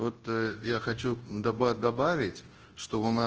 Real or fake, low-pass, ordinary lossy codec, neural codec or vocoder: fake; 7.2 kHz; Opus, 16 kbps; codec, 24 kHz, 0.9 kbps, WavTokenizer, large speech release